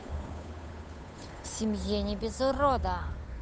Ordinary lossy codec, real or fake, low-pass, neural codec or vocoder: none; fake; none; codec, 16 kHz, 8 kbps, FunCodec, trained on Chinese and English, 25 frames a second